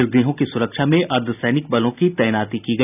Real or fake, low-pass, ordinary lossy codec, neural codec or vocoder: real; 3.6 kHz; none; none